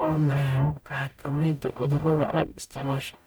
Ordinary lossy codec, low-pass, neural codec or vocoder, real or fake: none; none; codec, 44.1 kHz, 0.9 kbps, DAC; fake